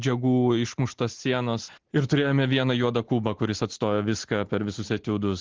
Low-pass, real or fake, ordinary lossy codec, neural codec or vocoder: 7.2 kHz; real; Opus, 16 kbps; none